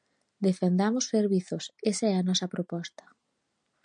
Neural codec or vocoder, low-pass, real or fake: none; 9.9 kHz; real